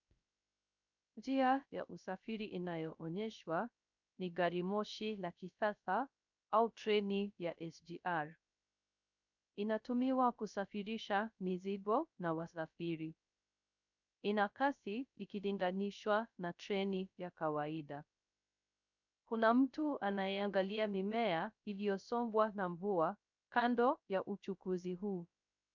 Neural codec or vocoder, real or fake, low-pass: codec, 16 kHz, 0.3 kbps, FocalCodec; fake; 7.2 kHz